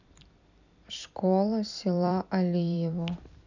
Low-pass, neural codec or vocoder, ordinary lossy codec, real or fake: 7.2 kHz; vocoder, 44.1 kHz, 128 mel bands every 256 samples, BigVGAN v2; none; fake